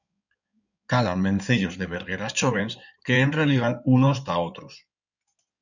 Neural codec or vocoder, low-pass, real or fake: codec, 16 kHz in and 24 kHz out, 2.2 kbps, FireRedTTS-2 codec; 7.2 kHz; fake